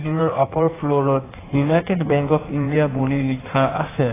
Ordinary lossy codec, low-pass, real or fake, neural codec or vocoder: AAC, 16 kbps; 3.6 kHz; fake; codec, 16 kHz in and 24 kHz out, 1.1 kbps, FireRedTTS-2 codec